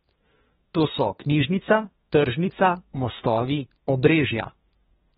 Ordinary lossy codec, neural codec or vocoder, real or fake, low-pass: AAC, 16 kbps; codec, 32 kHz, 1.9 kbps, SNAC; fake; 14.4 kHz